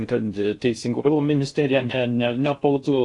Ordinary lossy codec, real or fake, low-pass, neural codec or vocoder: AAC, 48 kbps; fake; 10.8 kHz; codec, 16 kHz in and 24 kHz out, 0.6 kbps, FocalCodec, streaming, 4096 codes